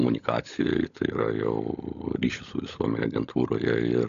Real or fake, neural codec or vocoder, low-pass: fake; codec, 16 kHz, 16 kbps, FunCodec, trained on LibriTTS, 50 frames a second; 7.2 kHz